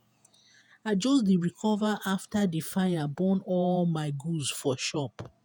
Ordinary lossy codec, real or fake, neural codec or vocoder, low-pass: none; fake; vocoder, 48 kHz, 128 mel bands, Vocos; none